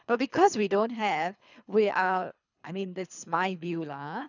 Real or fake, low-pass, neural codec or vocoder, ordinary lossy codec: fake; 7.2 kHz; codec, 24 kHz, 3 kbps, HILCodec; none